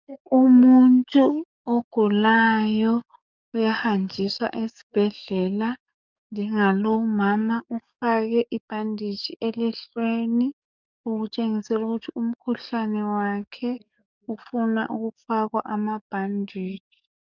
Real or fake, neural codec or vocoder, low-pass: fake; codec, 44.1 kHz, 7.8 kbps, DAC; 7.2 kHz